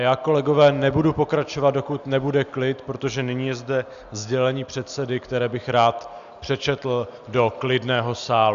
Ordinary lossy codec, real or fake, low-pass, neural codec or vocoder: Opus, 64 kbps; real; 7.2 kHz; none